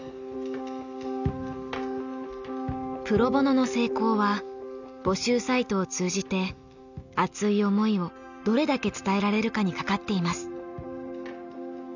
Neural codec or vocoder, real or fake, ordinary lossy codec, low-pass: none; real; none; 7.2 kHz